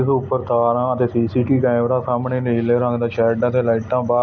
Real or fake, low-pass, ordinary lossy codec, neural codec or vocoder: real; none; none; none